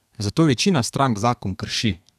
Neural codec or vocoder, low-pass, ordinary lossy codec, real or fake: codec, 32 kHz, 1.9 kbps, SNAC; 14.4 kHz; none; fake